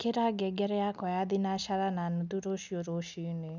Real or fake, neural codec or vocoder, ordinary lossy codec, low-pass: real; none; none; 7.2 kHz